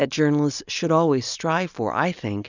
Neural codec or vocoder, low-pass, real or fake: none; 7.2 kHz; real